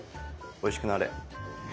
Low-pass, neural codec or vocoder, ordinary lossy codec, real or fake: none; none; none; real